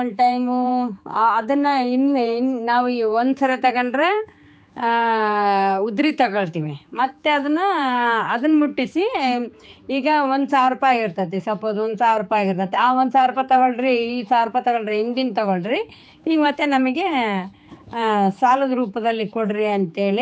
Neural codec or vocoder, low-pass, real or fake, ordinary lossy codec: codec, 16 kHz, 4 kbps, X-Codec, HuBERT features, trained on general audio; none; fake; none